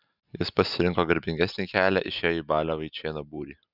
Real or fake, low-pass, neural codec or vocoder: real; 5.4 kHz; none